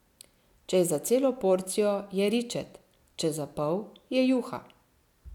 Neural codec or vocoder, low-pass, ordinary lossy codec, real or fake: none; 19.8 kHz; none; real